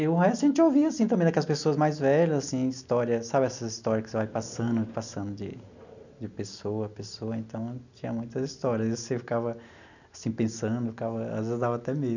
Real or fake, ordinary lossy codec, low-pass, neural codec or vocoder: real; none; 7.2 kHz; none